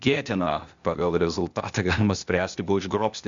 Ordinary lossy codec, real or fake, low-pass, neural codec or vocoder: Opus, 64 kbps; fake; 7.2 kHz; codec, 16 kHz, 0.8 kbps, ZipCodec